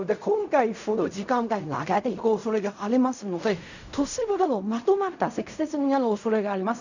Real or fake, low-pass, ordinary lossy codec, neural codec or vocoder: fake; 7.2 kHz; none; codec, 16 kHz in and 24 kHz out, 0.4 kbps, LongCat-Audio-Codec, fine tuned four codebook decoder